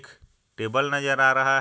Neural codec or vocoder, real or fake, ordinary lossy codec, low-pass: none; real; none; none